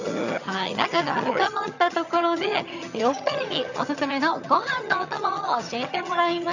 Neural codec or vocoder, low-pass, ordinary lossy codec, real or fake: vocoder, 22.05 kHz, 80 mel bands, HiFi-GAN; 7.2 kHz; none; fake